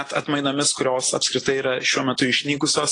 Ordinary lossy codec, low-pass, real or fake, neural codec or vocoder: AAC, 32 kbps; 9.9 kHz; real; none